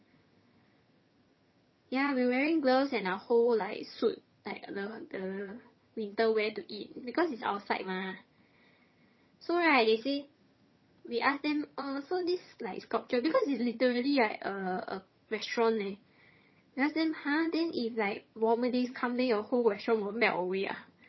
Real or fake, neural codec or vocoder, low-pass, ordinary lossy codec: fake; vocoder, 22.05 kHz, 80 mel bands, HiFi-GAN; 7.2 kHz; MP3, 24 kbps